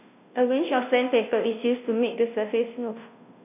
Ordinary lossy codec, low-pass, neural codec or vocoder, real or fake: none; 3.6 kHz; codec, 16 kHz, 0.5 kbps, FunCodec, trained on Chinese and English, 25 frames a second; fake